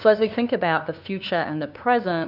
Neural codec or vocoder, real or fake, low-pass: codec, 16 kHz, 2 kbps, FunCodec, trained on LibriTTS, 25 frames a second; fake; 5.4 kHz